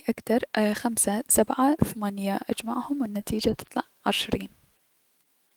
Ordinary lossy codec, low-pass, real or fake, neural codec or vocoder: Opus, 24 kbps; 19.8 kHz; fake; autoencoder, 48 kHz, 128 numbers a frame, DAC-VAE, trained on Japanese speech